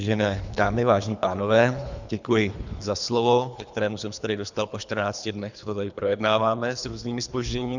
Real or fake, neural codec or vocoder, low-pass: fake; codec, 24 kHz, 3 kbps, HILCodec; 7.2 kHz